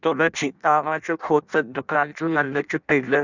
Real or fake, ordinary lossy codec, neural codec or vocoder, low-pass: fake; none; codec, 16 kHz in and 24 kHz out, 0.6 kbps, FireRedTTS-2 codec; 7.2 kHz